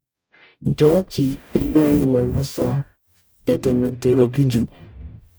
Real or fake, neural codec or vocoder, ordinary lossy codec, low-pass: fake; codec, 44.1 kHz, 0.9 kbps, DAC; none; none